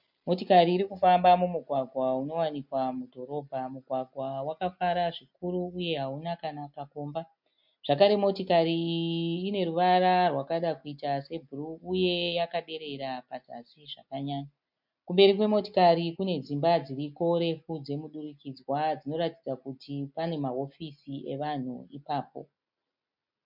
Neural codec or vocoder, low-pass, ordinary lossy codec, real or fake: none; 5.4 kHz; MP3, 48 kbps; real